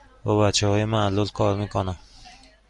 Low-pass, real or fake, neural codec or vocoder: 10.8 kHz; real; none